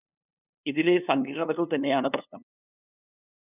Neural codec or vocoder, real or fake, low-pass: codec, 16 kHz, 2 kbps, FunCodec, trained on LibriTTS, 25 frames a second; fake; 3.6 kHz